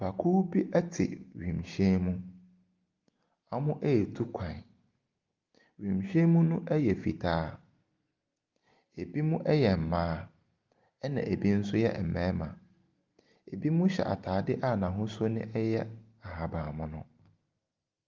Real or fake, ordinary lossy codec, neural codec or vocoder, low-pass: real; Opus, 32 kbps; none; 7.2 kHz